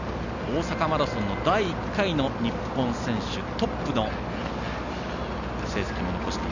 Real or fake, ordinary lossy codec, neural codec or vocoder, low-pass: real; none; none; 7.2 kHz